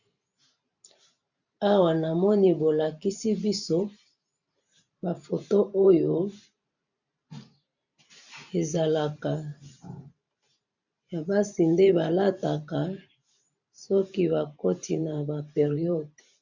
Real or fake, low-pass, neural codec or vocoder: fake; 7.2 kHz; vocoder, 44.1 kHz, 128 mel bands every 512 samples, BigVGAN v2